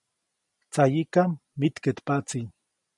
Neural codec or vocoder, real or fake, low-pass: none; real; 10.8 kHz